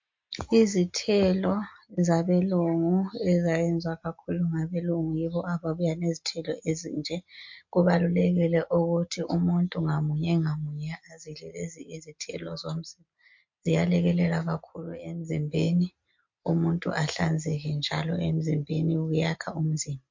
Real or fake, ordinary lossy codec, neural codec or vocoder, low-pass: real; MP3, 48 kbps; none; 7.2 kHz